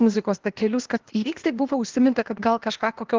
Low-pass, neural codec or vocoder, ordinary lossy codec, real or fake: 7.2 kHz; codec, 16 kHz, 0.8 kbps, ZipCodec; Opus, 16 kbps; fake